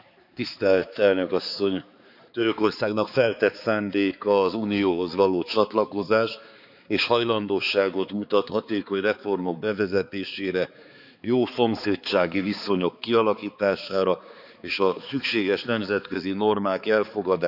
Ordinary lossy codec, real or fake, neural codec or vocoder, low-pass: none; fake; codec, 16 kHz, 4 kbps, X-Codec, HuBERT features, trained on balanced general audio; 5.4 kHz